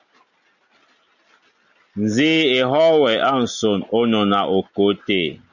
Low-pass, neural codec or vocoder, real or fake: 7.2 kHz; none; real